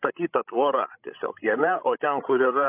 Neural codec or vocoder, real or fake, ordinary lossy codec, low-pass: codec, 16 kHz, 16 kbps, FreqCodec, larger model; fake; AAC, 24 kbps; 3.6 kHz